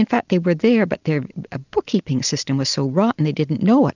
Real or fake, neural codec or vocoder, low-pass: real; none; 7.2 kHz